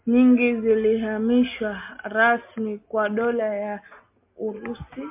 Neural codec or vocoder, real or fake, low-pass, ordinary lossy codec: none; real; 3.6 kHz; MP3, 32 kbps